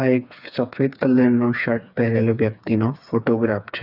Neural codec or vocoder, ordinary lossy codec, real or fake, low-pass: codec, 16 kHz, 4 kbps, FreqCodec, smaller model; none; fake; 5.4 kHz